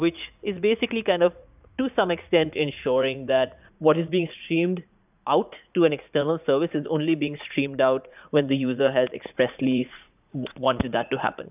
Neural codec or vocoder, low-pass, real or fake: vocoder, 44.1 kHz, 80 mel bands, Vocos; 3.6 kHz; fake